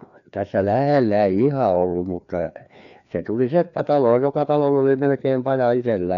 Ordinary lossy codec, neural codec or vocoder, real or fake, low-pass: none; codec, 16 kHz, 2 kbps, FreqCodec, larger model; fake; 7.2 kHz